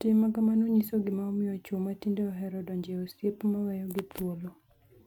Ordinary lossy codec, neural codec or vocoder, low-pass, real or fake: none; none; 19.8 kHz; real